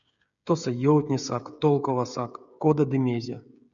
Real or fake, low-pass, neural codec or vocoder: fake; 7.2 kHz; codec, 16 kHz, 16 kbps, FreqCodec, smaller model